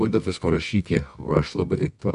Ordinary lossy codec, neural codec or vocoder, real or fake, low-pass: MP3, 96 kbps; codec, 24 kHz, 0.9 kbps, WavTokenizer, medium music audio release; fake; 10.8 kHz